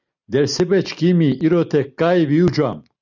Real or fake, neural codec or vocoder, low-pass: real; none; 7.2 kHz